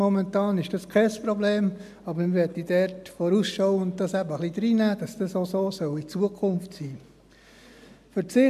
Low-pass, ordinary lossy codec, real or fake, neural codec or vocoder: 14.4 kHz; AAC, 96 kbps; real; none